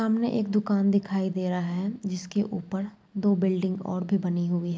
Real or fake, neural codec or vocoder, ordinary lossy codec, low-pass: real; none; none; none